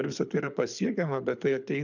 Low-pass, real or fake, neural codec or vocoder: 7.2 kHz; fake; codec, 24 kHz, 6 kbps, HILCodec